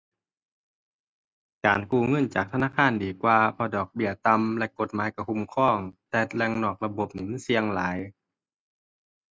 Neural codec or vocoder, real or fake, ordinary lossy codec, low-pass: none; real; none; none